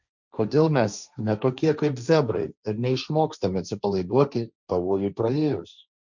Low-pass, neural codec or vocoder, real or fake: 7.2 kHz; codec, 16 kHz, 1.1 kbps, Voila-Tokenizer; fake